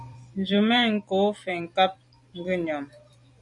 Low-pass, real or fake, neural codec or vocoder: 10.8 kHz; fake; vocoder, 44.1 kHz, 128 mel bands every 256 samples, BigVGAN v2